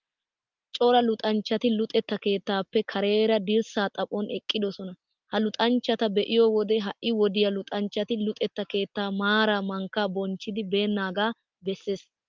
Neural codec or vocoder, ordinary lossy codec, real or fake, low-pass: none; Opus, 24 kbps; real; 7.2 kHz